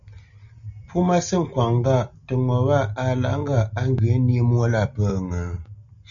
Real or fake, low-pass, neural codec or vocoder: real; 7.2 kHz; none